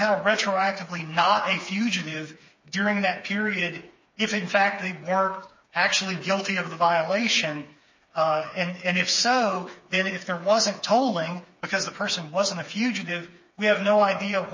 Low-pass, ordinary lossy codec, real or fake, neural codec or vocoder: 7.2 kHz; MP3, 32 kbps; fake; codec, 16 kHz, 4 kbps, FreqCodec, smaller model